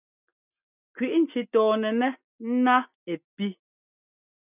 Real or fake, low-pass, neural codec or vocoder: real; 3.6 kHz; none